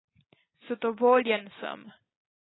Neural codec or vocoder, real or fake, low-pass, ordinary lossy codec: none; real; 7.2 kHz; AAC, 16 kbps